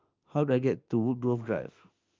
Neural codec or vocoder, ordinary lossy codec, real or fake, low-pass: autoencoder, 48 kHz, 32 numbers a frame, DAC-VAE, trained on Japanese speech; Opus, 24 kbps; fake; 7.2 kHz